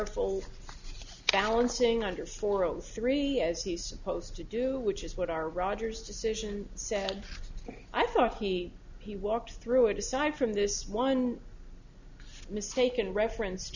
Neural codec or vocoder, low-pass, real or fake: none; 7.2 kHz; real